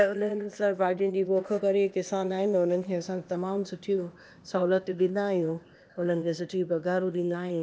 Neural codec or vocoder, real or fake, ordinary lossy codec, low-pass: codec, 16 kHz, 0.8 kbps, ZipCodec; fake; none; none